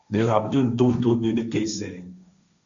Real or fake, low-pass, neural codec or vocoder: fake; 7.2 kHz; codec, 16 kHz, 1.1 kbps, Voila-Tokenizer